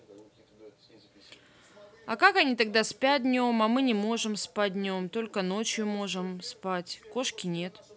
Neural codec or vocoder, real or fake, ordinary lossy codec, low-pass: none; real; none; none